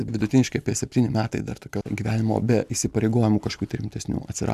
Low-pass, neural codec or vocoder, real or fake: 14.4 kHz; none; real